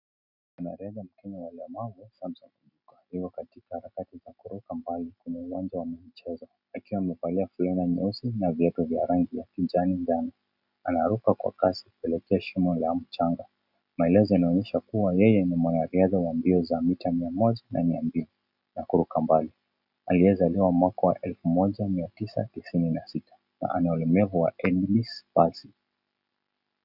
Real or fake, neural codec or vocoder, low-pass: real; none; 5.4 kHz